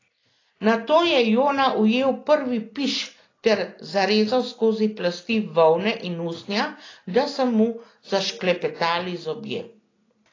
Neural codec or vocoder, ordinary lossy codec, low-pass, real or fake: none; AAC, 32 kbps; 7.2 kHz; real